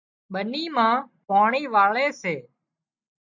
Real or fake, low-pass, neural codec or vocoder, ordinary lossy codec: real; 7.2 kHz; none; MP3, 64 kbps